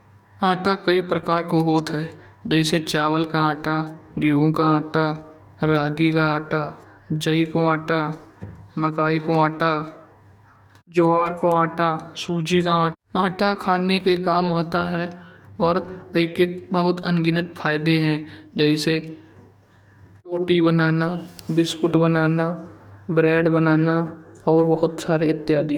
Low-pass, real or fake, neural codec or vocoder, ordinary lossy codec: 19.8 kHz; fake; codec, 44.1 kHz, 2.6 kbps, DAC; none